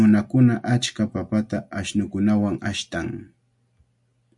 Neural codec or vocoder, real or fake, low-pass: none; real; 10.8 kHz